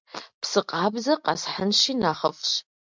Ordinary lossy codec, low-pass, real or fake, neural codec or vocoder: MP3, 64 kbps; 7.2 kHz; real; none